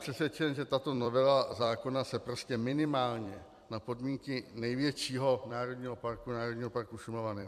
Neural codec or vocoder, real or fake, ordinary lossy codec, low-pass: vocoder, 44.1 kHz, 128 mel bands every 256 samples, BigVGAN v2; fake; AAC, 96 kbps; 14.4 kHz